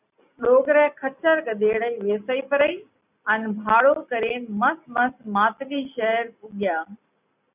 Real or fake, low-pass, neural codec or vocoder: real; 3.6 kHz; none